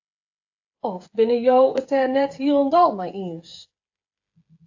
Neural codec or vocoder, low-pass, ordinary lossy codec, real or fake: codec, 16 kHz, 8 kbps, FreqCodec, smaller model; 7.2 kHz; AAC, 48 kbps; fake